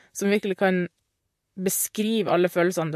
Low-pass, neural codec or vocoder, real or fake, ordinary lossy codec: 14.4 kHz; vocoder, 44.1 kHz, 128 mel bands, Pupu-Vocoder; fake; MP3, 64 kbps